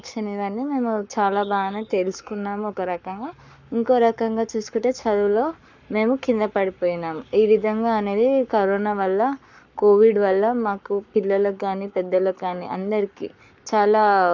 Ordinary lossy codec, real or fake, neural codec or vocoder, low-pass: none; fake; codec, 44.1 kHz, 7.8 kbps, Pupu-Codec; 7.2 kHz